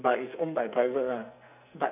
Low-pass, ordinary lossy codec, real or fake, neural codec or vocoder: 3.6 kHz; none; fake; codec, 44.1 kHz, 2.6 kbps, SNAC